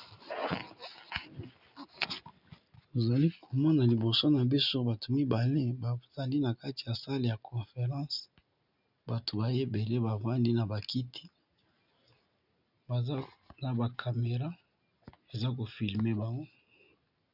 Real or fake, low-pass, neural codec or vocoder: real; 5.4 kHz; none